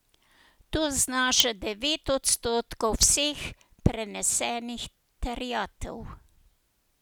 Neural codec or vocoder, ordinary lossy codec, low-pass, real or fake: none; none; none; real